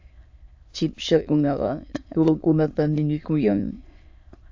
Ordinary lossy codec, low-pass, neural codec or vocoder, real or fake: AAC, 48 kbps; 7.2 kHz; autoencoder, 22.05 kHz, a latent of 192 numbers a frame, VITS, trained on many speakers; fake